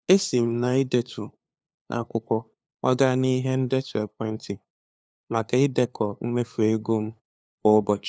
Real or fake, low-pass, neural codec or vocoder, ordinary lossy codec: fake; none; codec, 16 kHz, 2 kbps, FunCodec, trained on LibriTTS, 25 frames a second; none